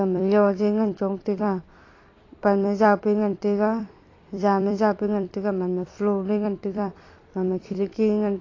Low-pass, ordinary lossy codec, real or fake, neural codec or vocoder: 7.2 kHz; AAC, 32 kbps; fake; vocoder, 44.1 kHz, 128 mel bands every 256 samples, BigVGAN v2